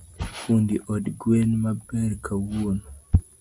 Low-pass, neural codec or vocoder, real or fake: 10.8 kHz; none; real